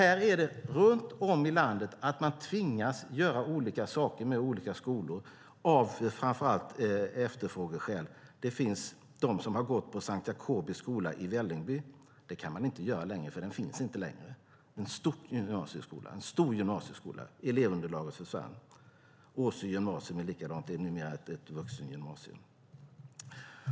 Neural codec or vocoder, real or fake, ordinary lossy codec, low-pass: none; real; none; none